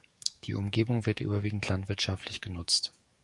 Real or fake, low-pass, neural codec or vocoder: fake; 10.8 kHz; codec, 44.1 kHz, 7.8 kbps, DAC